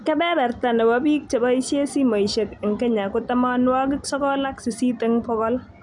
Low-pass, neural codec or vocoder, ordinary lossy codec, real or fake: 10.8 kHz; none; none; real